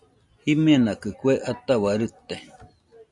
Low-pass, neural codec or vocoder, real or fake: 10.8 kHz; none; real